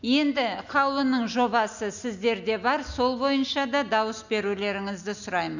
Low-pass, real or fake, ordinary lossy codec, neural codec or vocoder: 7.2 kHz; real; none; none